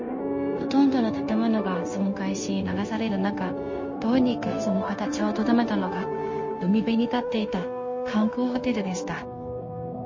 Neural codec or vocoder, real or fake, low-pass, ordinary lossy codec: codec, 16 kHz, 0.9 kbps, LongCat-Audio-Codec; fake; 7.2 kHz; MP3, 32 kbps